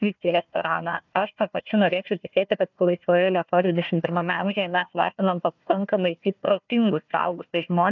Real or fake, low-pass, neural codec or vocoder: fake; 7.2 kHz; codec, 16 kHz, 1 kbps, FunCodec, trained on Chinese and English, 50 frames a second